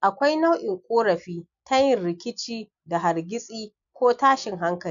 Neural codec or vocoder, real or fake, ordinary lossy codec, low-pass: none; real; MP3, 96 kbps; 7.2 kHz